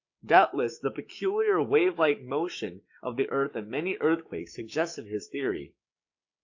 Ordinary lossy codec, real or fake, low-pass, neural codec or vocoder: AAC, 48 kbps; fake; 7.2 kHz; codec, 16 kHz, 6 kbps, DAC